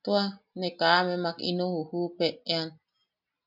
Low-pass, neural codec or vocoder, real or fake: 5.4 kHz; none; real